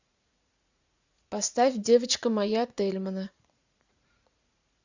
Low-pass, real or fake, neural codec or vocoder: 7.2 kHz; fake; vocoder, 44.1 kHz, 80 mel bands, Vocos